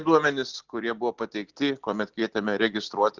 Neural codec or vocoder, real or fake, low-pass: none; real; 7.2 kHz